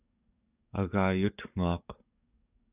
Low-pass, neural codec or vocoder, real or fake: 3.6 kHz; codec, 44.1 kHz, 7.8 kbps, DAC; fake